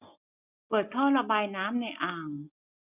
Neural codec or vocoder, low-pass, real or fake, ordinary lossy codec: none; 3.6 kHz; real; none